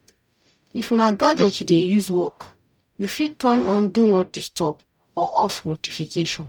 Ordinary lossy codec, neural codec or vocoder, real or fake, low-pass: none; codec, 44.1 kHz, 0.9 kbps, DAC; fake; 19.8 kHz